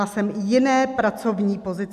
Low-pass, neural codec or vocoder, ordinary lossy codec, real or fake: 14.4 kHz; none; AAC, 96 kbps; real